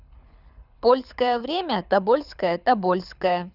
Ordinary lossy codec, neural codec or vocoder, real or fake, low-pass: none; codec, 24 kHz, 6 kbps, HILCodec; fake; 5.4 kHz